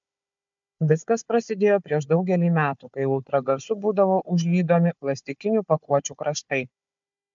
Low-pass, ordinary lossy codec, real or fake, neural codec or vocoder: 7.2 kHz; MP3, 64 kbps; fake; codec, 16 kHz, 4 kbps, FunCodec, trained on Chinese and English, 50 frames a second